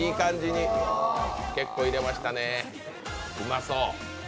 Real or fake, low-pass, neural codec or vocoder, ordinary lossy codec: real; none; none; none